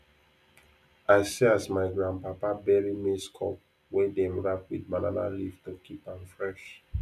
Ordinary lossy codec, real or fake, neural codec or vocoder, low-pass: none; real; none; 14.4 kHz